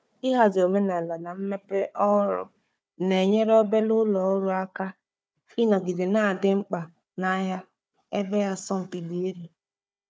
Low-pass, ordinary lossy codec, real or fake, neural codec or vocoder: none; none; fake; codec, 16 kHz, 4 kbps, FunCodec, trained on Chinese and English, 50 frames a second